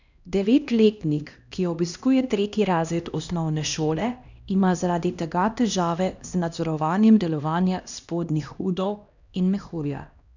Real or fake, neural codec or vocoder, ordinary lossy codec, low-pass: fake; codec, 16 kHz, 1 kbps, X-Codec, HuBERT features, trained on LibriSpeech; none; 7.2 kHz